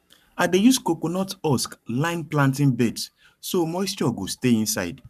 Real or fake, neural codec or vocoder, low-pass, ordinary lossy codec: fake; codec, 44.1 kHz, 7.8 kbps, Pupu-Codec; 14.4 kHz; none